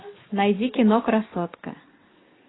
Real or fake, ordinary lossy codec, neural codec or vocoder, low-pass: real; AAC, 16 kbps; none; 7.2 kHz